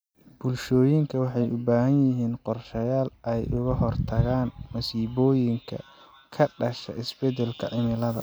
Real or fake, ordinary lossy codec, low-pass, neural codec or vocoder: real; none; none; none